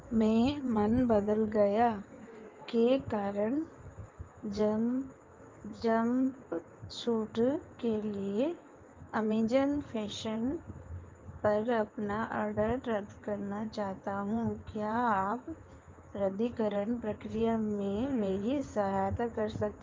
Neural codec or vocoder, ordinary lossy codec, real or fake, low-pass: codec, 16 kHz in and 24 kHz out, 2.2 kbps, FireRedTTS-2 codec; Opus, 24 kbps; fake; 7.2 kHz